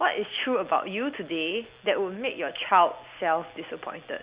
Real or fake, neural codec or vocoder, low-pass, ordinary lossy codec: real; none; 3.6 kHz; Opus, 64 kbps